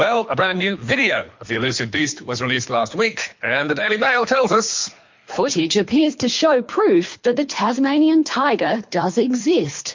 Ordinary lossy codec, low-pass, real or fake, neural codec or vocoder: MP3, 48 kbps; 7.2 kHz; fake; codec, 24 kHz, 3 kbps, HILCodec